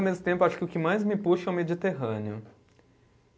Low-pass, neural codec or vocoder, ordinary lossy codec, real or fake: none; none; none; real